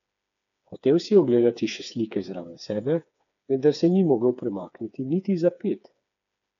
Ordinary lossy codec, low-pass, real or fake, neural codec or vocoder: none; 7.2 kHz; fake; codec, 16 kHz, 4 kbps, FreqCodec, smaller model